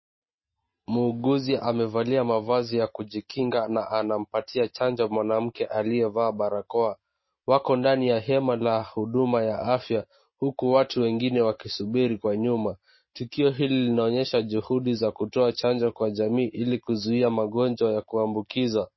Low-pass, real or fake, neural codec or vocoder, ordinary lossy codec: 7.2 kHz; real; none; MP3, 24 kbps